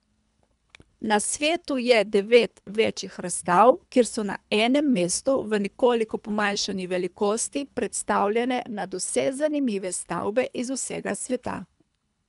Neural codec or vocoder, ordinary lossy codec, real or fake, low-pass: codec, 24 kHz, 3 kbps, HILCodec; none; fake; 10.8 kHz